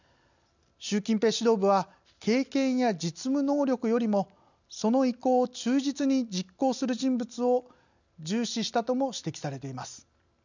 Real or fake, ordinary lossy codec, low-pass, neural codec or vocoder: real; none; 7.2 kHz; none